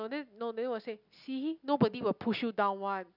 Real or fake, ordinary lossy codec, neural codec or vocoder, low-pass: real; none; none; 5.4 kHz